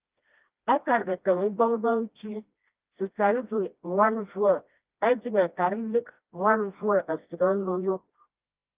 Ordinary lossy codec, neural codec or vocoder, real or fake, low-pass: Opus, 32 kbps; codec, 16 kHz, 1 kbps, FreqCodec, smaller model; fake; 3.6 kHz